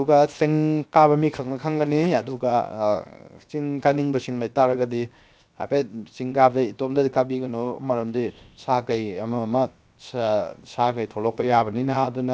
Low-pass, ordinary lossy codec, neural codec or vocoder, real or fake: none; none; codec, 16 kHz, 0.7 kbps, FocalCodec; fake